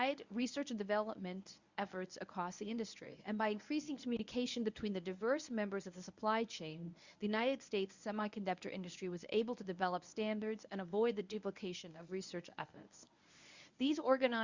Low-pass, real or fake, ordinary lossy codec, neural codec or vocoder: 7.2 kHz; fake; Opus, 64 kbps; codec, 24 kHz, 0.9 kbps, WavTokenizer, medium speech release version 1